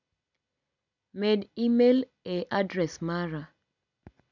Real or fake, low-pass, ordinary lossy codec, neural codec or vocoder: real; 7.2 kHz; none; none